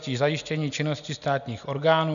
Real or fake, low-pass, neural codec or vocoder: real; 7.2 kHz; none